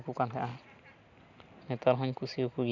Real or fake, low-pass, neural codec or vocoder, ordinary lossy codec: real; 7.2 kHz; none; none